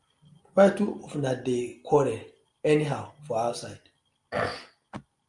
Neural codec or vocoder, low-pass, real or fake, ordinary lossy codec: none; 10.8 kHz; real; Opus, 32 kbps